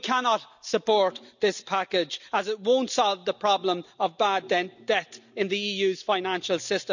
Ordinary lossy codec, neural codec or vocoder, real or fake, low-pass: none; none; real; 7.2 kHz